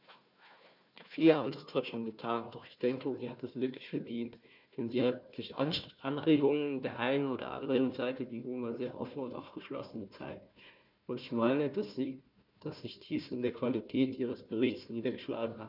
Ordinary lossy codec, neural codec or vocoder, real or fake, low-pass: none; codec, 16 kHz, 1 kbps, FunCodec, trained on Chinese and English, 50 frames a second; fake; 5.4 kHz